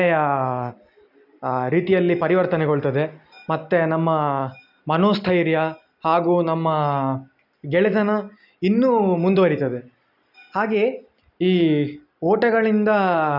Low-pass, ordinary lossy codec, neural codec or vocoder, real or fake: 5.4 kHz; none; none; real